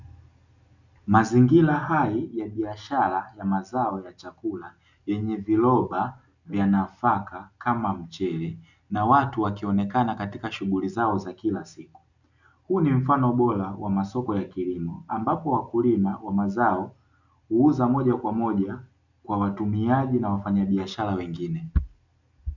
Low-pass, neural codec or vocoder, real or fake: 7.2 kHz; none; real